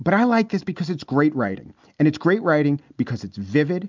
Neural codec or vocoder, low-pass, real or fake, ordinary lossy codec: none; 7.2 kHz; real; MP3, 64 kbps